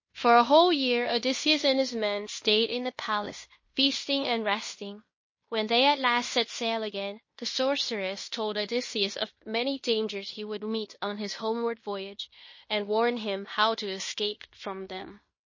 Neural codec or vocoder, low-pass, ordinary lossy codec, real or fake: codec, 16 kHz in and 24 kHz out, 0.9 kbps, LongCat-Audio-Codec, fine tuned four codebook decoder; 7.2 kHz; MP3, 32 kbps; fake